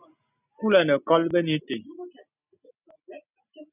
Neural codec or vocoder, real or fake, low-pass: none; real; 3.6 kHz